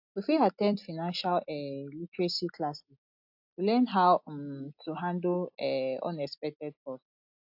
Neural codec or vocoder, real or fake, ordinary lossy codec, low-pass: none; real; AAC, 48 kbps; 5.4 kHz